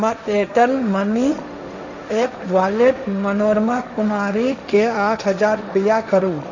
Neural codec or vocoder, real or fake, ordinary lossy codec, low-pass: codec, 16 kHz, 1.1 kbps, Voila-Tokenizer; fake; none; 7.2 kHz